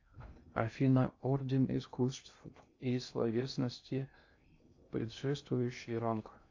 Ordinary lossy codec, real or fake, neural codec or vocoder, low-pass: AAC, 48 kbps; fake; codec, 16 kHz in and 24 kHz out, 0.6 kbps, FocalCodec, streaming, 2048 codes; 7.2 kHz